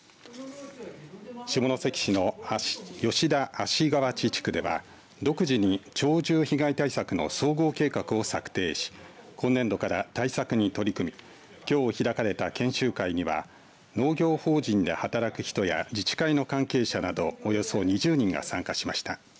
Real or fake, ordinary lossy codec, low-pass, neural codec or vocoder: real; none; none; none